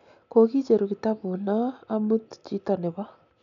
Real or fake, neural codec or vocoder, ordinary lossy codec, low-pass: real; none; none; 7.2 kHz